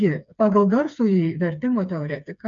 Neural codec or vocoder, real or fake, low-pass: codec, 16 kHz, 4 kbps, FreqCodec, smaller model; fake; 7.2 kHz